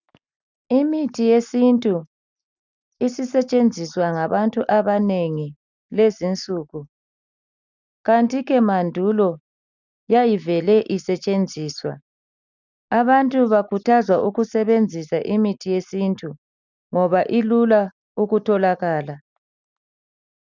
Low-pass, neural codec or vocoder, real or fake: 7.2 kHz; none; real